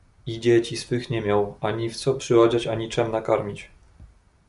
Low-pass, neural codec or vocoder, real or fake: 10.8 kHz; none; real